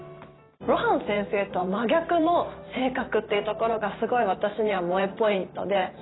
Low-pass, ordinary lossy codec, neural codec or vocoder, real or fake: 7.2 kHz; AAC, 16 kbps; vocoder, 44.1 kHz, 128 mel bands every 512 samples, BigVGAN v2; fake